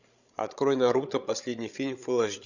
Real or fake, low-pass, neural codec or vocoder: fake; 7.2 kHz; codec, 16 kHz, 16 kbps, FreqCodec, larger model